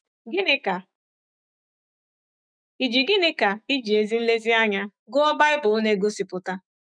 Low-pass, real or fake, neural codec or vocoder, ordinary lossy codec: 9.9 kHz; fake; autoencoder, 48 kHz, 128 numbers a frame, DAC-VAE, trained on Japanese speech; none